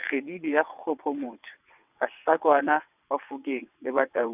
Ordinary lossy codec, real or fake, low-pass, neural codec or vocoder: none; fake; 3.6 kHz; vocoder, 22.05 kHz, 80 mel bands, WaveNeXt